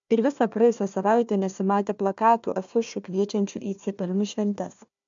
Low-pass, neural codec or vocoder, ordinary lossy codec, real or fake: 7.2 kHz; codec, 16 kHz, 1 kbps, FunCodec, trained on Chinese and English, 50 frames a second; MP3, 64 kbps; fake